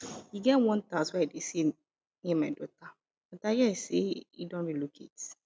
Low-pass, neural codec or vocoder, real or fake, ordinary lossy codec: none; none; real; none